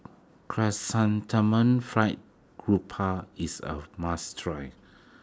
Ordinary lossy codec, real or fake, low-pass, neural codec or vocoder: none; real; none; none